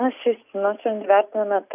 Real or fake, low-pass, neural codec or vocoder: real; 3.6 kHz; none